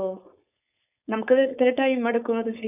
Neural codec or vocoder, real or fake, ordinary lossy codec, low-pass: codec, 16 kHz, 4.8 kbps, FACodec; fake; none; 3.6 kHz